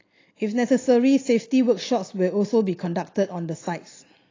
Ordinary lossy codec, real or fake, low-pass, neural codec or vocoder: AAC, 32 kbps; real; 7.2 kHz; none